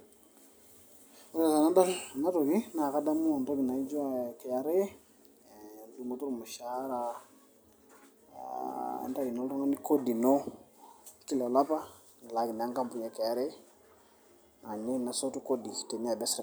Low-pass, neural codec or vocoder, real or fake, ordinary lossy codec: none; none; real; none